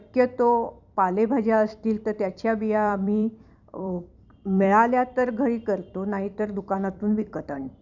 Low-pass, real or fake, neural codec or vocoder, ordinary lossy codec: 7.2 kHz; fake; vocoder, 44.1 kHz, 128 mel bands every 256 samples, BigVGAN v2; none